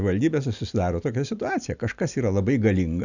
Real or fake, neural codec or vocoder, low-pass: real; none; 7.2 kHz